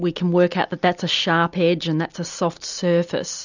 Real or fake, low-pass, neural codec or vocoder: real; 7.2 kHz; none